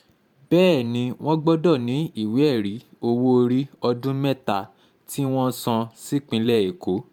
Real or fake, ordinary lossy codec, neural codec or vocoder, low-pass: fake; MP3, 96 kbps; vocoder, 44.1 kHz, 128 mel bands every 512 samples, BigVGAN v2; 19.8 kHz